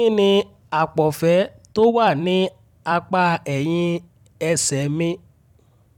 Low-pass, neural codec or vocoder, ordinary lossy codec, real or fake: 19.8 kHz; vocoder, 44.1 kHz, 128 mel bands every 256 samples, BigVGAN v2; none; fake